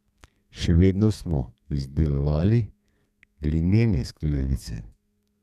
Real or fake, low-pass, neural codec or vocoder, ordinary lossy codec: fake; 14.4 kHz; codec, 32 kHz, 1.9 kbps, SNAC; none